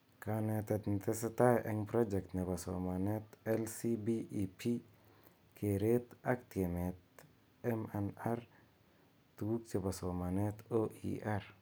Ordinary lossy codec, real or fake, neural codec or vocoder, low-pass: none; real; none; none